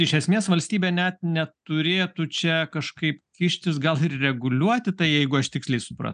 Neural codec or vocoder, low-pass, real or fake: none; 9.9 kHz; real